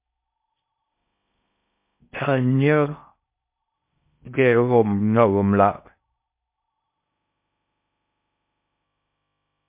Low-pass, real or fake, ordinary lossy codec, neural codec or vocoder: 3.6 kHz; fake; MP3, 32 kbps; codec, 16 kHz in and 24 kHz out, 0.6 kbps, FocalCodec, streaming, 4096 codes